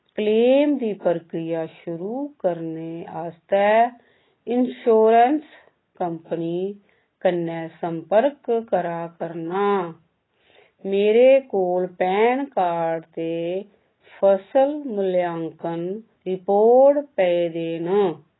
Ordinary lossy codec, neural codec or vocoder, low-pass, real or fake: AAC, 16 kbps; none; 7.2 kHz; real